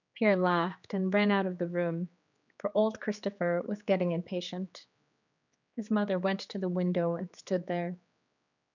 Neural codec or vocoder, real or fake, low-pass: codec, 16 kHz, 4 kbps, X-Codec, HuBERT features, trained on general audio; fake; 7.2 kHz